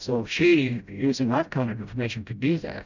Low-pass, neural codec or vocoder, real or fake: 7.2 kHz; codec, 16 kHz, 0.5 kbps, FreqCodec, smaller model; fake